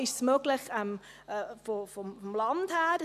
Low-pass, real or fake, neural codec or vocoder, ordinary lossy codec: 14.4 kHz; real; none; none